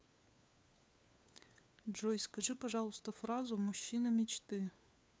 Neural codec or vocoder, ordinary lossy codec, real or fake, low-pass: codec, 16 kHz, 4 kbps, FunCodec, trained on LibriTTS, 50 frames a second; none; fake; none